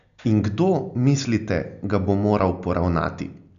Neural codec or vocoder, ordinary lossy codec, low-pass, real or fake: none; none; 7.2 kHz; real